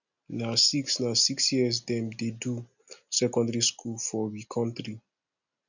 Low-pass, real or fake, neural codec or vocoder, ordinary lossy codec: 7.2 kHz; real; none; none